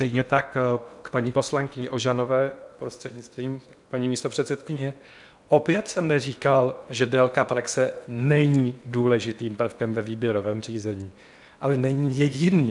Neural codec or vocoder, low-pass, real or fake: codec, 16 kHz in and 24 kHz out, 0.8 kbps, FocalCodec, streaming, 65536 codes; 10.8 kHz; fake